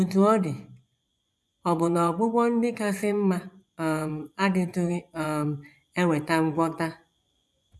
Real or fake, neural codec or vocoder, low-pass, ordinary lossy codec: fake; vocoder, 24 kHz, 100 mel bands, Vocos; none; none